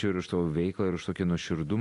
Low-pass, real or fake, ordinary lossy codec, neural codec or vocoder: 10.8 kHz; real; AAC, 48 kbps; none